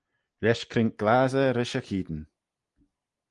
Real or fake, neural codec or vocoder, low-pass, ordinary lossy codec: real; none; 10.8 kHz; Opus, 24 kbps